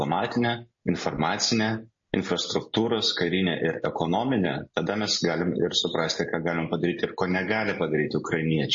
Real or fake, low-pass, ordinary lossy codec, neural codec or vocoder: real; 7.2 kHz; MP3, 32 kbps; none